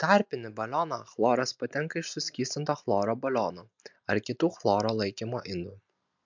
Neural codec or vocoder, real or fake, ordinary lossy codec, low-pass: none; real; MP3, 64 kbps; 7.2 kHz